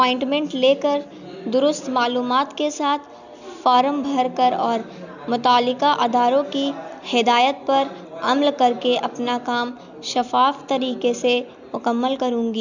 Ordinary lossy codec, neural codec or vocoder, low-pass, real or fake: none; none; 7.2 kHz; real